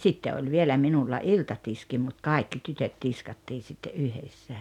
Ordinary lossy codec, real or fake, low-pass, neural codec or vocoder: none; real; 19.8 kHz; none